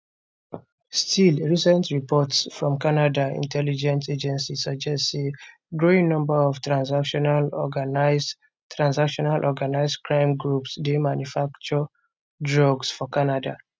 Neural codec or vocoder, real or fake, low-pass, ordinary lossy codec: none; real; none; none